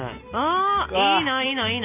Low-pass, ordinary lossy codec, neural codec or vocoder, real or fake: 3.6 kHz; none; none; real